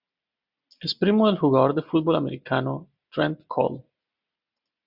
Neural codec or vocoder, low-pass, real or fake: none; 5.4 kHz; real